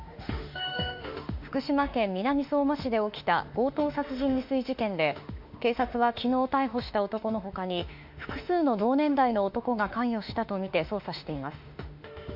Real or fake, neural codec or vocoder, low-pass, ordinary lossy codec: fake; autoencoder, 48 kHz, 32 numbers a frame, DAC-VAE, trained on Japanese speech; 5.4 kHz; MP3, 48 kbps